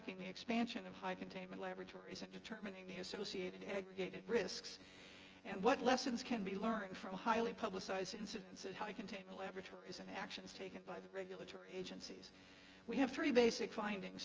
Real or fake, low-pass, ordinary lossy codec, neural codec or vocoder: fake; 7.2 kHz; Opus, 24 kbps; vocoder, 24 kHz, 100 mel bands, Vocos